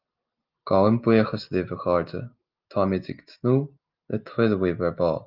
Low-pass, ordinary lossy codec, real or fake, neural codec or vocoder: 5.4 kHz; Opus, 32 kbps; real; none